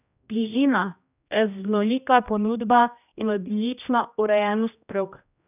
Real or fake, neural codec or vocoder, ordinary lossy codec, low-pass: fake; codec, 16 kHz, 1 kbps, X-Codec, HuBERT features, trained on general audio; none; 3.6 kHz